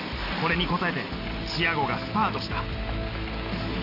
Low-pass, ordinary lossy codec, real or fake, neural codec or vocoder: 5.4 kHz; AAC, 32 kbps; real; none